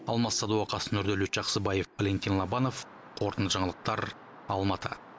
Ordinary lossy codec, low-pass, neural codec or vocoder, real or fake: none; none; none; real